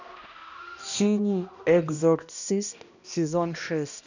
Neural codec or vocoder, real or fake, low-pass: codec, 16 kHz, 1 kbps, X-Codec, HuBERT features, trained on balanced general audio; fake; 7.2 kHz